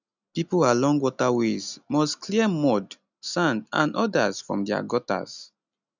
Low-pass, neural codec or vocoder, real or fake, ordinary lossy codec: 7.2 kHz; none; real; none